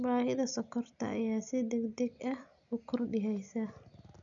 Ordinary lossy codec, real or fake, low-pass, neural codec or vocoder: none; real; 7.2 kHz; none